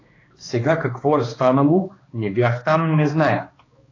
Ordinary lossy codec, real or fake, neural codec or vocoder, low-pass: AAC, 32 kbps; fake; codec, 16 kHz, 2 kbps, X-Codec, HuBERT features, trained on balanced general audio; 7.2 kHz